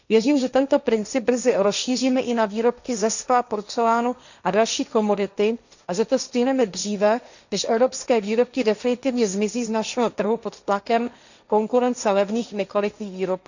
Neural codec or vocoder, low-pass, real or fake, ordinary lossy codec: codec, 16 kHz, 1.1 kbps, Voila-Tokenizer; 7.2 kHz; fake; none